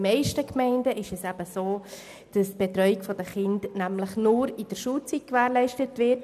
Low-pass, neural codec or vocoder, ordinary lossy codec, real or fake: 14.4 kHz; none; none; real